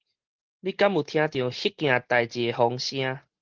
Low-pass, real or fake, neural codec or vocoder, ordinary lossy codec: 7.2 kHz; real; none; Opus, 16 kbps